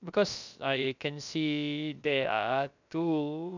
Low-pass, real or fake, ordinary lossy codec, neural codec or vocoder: 7.2 kHz; fake; none; codec, 16 kHz, about 1 kbps, DyCAST, with the encoder's durations